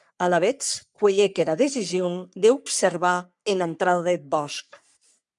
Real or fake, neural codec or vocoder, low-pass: fake; codec, 44.1 kHz, 3.4 kbps, Pupu-Codec; 10.8 kHz